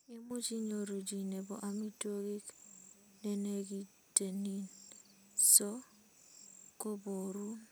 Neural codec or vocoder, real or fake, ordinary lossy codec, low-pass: none; real; none; none